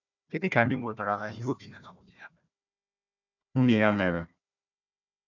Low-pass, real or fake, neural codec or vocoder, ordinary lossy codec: 7.2 kHz; fake; codec, 16 kHz, 1 kbps, FunCodec, trained on Chinese and English, 50 frames a second; none